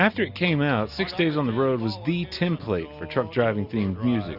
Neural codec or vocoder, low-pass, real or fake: none; 5.4 kHz; real